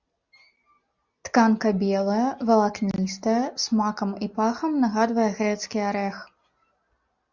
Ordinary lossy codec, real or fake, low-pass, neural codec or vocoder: Opus, 64 kbps; real; 7.2 kHz; none